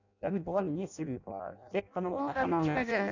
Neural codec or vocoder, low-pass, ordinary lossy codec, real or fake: codec, 16 kHz in and 24 kHz out, 0.6 kbps, FireRedTTS-2 codec; 7.2 kHz; none; fake